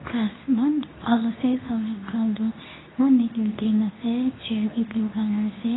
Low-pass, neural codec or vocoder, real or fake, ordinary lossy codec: 7.2 kHz; codec, 24 kHz, 0.9 kbps, WavTokenizer, medium speech release version 2; fake; AAC, 16 kbps